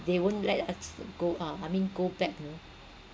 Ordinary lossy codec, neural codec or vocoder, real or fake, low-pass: none; none; real; none